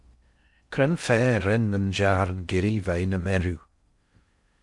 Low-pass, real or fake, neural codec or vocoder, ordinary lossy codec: 10.8 kHz; fake; codec, 16 kHz in and 24 kHz out, 0.6 kbps, FocalCodec, streaming, 2048 codes; MP3, 64 kbps